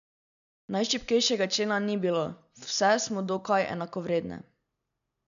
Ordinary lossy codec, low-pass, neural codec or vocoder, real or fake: none; 7.2 kHz; none; real